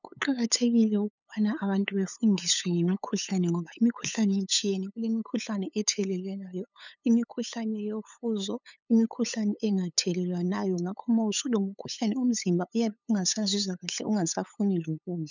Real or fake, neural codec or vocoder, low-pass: fake; codec, 16 kHz, 8 kbps, FunCodec, trained on LibriTTS, 25 frames a second; 7.2 kHz